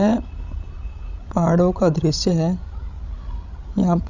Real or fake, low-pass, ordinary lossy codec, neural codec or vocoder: fake; 7.2 kHz; Opus, 64 kbps; codec, 16 kHz, 16 kbps, FreqCodec, larger model